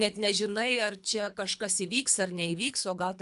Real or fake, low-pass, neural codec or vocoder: fake; 10.8 kHz; codec, 24 kHz, 3 kbps, HILCodec